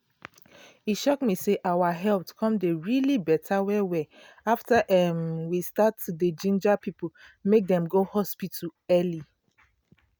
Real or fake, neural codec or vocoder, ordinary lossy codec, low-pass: real; none; none; none